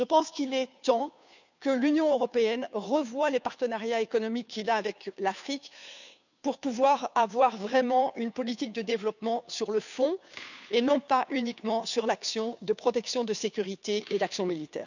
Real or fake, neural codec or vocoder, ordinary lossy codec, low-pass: fake; codec, 16 kHz, 2 kbps, FunCodec, trained on Chinese and English, 25 frames a second; none; 7.2 kHz